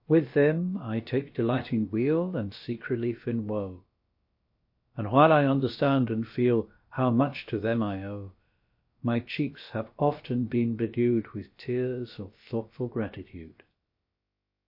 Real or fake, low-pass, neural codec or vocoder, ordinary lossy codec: fake; 5.4 kHz; codec, 16 kHz, about 1 kbps, DyCAST, with the encoder's durations; MP3, 32 kbps